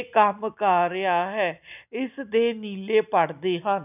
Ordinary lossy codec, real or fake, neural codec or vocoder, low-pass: none; real; none; 3.6 kHz